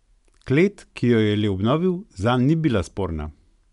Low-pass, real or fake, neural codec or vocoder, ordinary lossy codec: 10.8 kHz; real; none; none